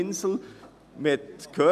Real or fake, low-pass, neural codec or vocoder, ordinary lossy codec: real; 14.4 kHz; none; none